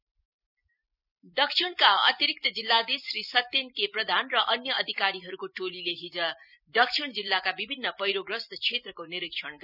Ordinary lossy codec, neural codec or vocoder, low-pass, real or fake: none; vocoder, 44.1 kHz, 128 mel bands every 256 samples, BigVGAN v2; 5.4 kHz; fake